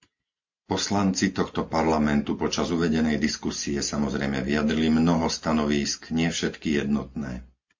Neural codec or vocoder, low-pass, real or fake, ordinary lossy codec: none; 7.2 kHz; real; MP3, 48 kbps